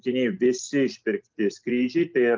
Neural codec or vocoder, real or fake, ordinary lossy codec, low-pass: none; real; Opus, 24 kbps; 7.2 kHz